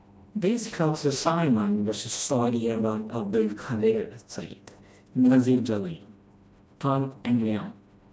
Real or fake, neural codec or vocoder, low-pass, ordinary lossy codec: fake; codec, 16 kHz, 1 kbps, FreqCodec, smaller model; none; none